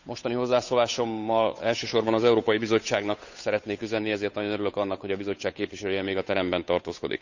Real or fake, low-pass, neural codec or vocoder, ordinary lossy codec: fake; 7.2 kHz; codec, 16 kHz, 8 kbps, FunCodec, trained on Chinese and English, 25 frames a second; none